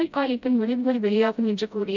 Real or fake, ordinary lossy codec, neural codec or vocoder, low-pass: fake; none; codec, 16 kHz, 0.5 kbps, FreqCodec, smaller model; 7.2 kHz